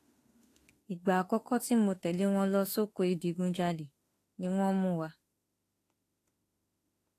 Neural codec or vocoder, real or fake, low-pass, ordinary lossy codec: autoencoder, 48 kHz, 32 numbers a frame, DAC-VAE, trained on Japanese speech; fake; 14.4 kHz; AAC, 48 kbps